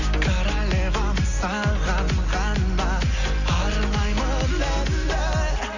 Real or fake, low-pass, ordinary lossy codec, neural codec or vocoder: real; 7.2 kHz; none; none